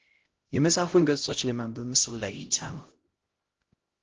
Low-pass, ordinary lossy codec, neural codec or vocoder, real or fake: 7.2 kHz; Opus, 16 kbps; codec, 16 kHz, 0.5 kbps, X-Codec, HuBERT features, trained on LibriSpeech; fake